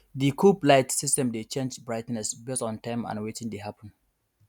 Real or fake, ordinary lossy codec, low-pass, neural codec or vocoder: real; none; 19.8 kHz; none